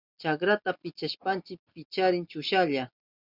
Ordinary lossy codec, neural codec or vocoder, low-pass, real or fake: Opus, 64 kbps; none; 5.4 kHz; real